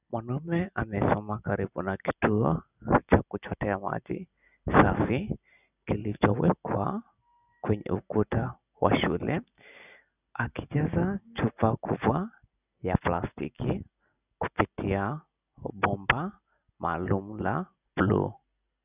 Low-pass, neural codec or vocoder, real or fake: 3.6 kHz; none; real